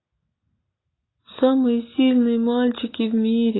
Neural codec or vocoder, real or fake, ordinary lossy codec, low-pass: none; real; AAC, 16 kbps; 7.2 kHz